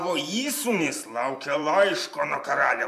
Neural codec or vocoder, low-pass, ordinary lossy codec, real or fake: vocoder, 44.1 kHz, 128 mel bands every 512 samples, BigVGAN v2; 14.4 kHz; AAC, 96 kbps; fake